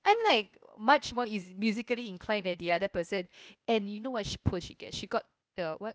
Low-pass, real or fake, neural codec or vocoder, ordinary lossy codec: none; fake; codec, 16 kHz, 0.8 kbps, ZipCodec; none